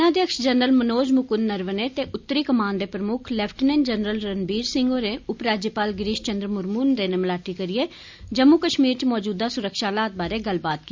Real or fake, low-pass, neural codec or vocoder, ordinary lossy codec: real; 7.2 kHz; none; MP3, 64 kbps